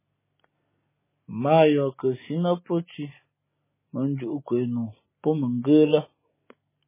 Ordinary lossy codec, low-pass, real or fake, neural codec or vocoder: MP3, 16 kbps; 3.6 kHz; real; none